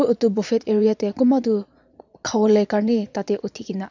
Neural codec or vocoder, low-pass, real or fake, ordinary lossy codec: vocoder, 22.05 kHz, 80 mel bands, Vocos; 7.2 kHz; fake; none